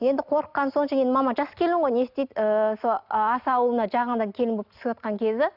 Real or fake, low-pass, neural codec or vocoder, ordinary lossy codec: real; 5.4 kHz; none; none